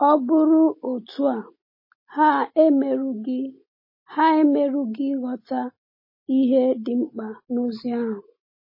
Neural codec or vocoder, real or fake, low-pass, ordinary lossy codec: none; real; 5.4 kHz; MP3, 24 kbps